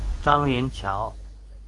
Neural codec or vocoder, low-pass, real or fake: codec, 24 kHz, 0.9 kbps, WavTokenizer, medium speech release version 2; 10.8 kHz; fake